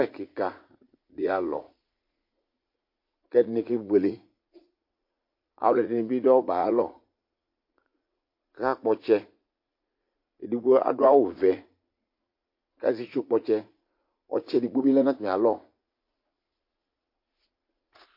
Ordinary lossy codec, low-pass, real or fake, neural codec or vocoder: MP3, 32 kbps; 5.4 kHz; fake; vocoder, 44.1 kHz, 80 mel bands, Vocos